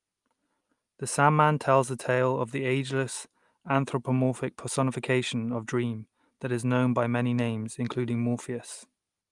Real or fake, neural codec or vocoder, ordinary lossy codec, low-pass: real; none; Opus, 32 kbps; 10.8 kHz